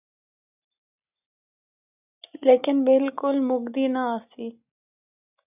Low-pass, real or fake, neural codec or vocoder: 3.6 kHz; real; none